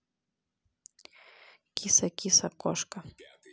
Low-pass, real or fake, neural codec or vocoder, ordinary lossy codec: none; real; none; none